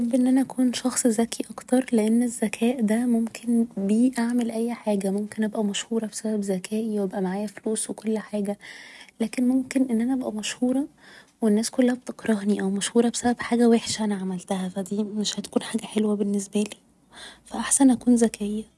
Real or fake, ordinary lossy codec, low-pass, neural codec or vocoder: real; none; none; none